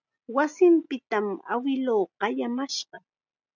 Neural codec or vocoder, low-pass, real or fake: none; 7.2 kHz; real